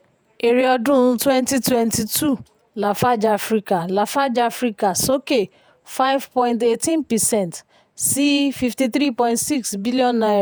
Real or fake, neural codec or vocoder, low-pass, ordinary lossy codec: fake; vocoder, 48 kHz, 128 mel bands, Vocos; none; none